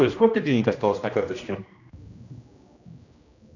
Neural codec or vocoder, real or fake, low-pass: codec, 16 kHz, 1 kbps, X-Codec, HuBERT features, trained on general audio; fake; 7.2 kHz